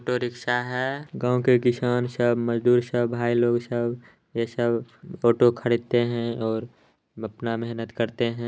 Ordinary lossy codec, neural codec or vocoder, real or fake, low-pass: none; none; real; none